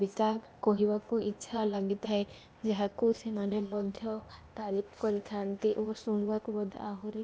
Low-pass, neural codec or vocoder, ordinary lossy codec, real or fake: none; codec, 16 kHz, 0.8 kbps, ZipCodec; none; fake